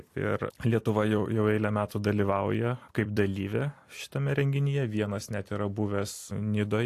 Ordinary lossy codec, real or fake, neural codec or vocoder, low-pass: AAC, 64 kbps; fake; vocoder, 44.1 kHz, 128 mel bands every 256 samples, BigVGAN v2; 14.4 kHz